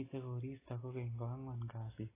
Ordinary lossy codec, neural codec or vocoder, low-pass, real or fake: AAC, 16 kbps; none; 3.6 kHz; real